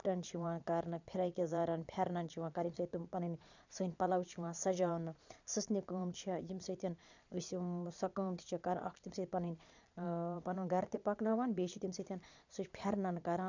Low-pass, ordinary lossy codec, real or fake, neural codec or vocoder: 7.2 kHz; none; fake; vocoder, 22.05 kHz, 80 mel bands, Vocos